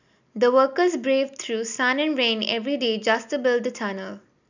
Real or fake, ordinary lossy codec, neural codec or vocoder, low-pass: real; none; none; 7.2 kHz